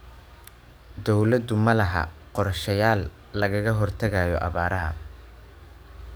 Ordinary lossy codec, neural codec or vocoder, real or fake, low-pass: none; codec, 44.1 kHz, 7.8 kbps, DAC; fake; none